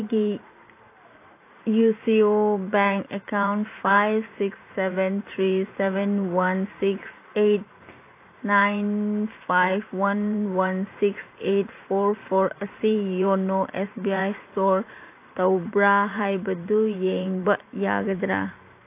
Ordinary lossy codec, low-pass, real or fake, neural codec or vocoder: AAC, 24 kbps; 3.6 kHz; fake; vocoder, 44.1 kHz, 128 mel bands every 512 samples, BigVGAN v2